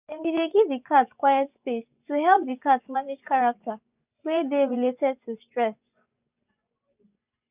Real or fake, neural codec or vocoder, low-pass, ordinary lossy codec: fake; vocoder, 24 kHz, 100 mel bands, Vocos; 3.6 kHz; none